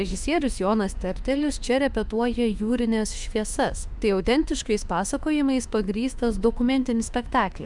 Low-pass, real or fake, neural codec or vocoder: 10.8 kHz; fake; autoencoder, 48 kHz, 32 numbers a frame, DAC-VAE, trained on Japanese speech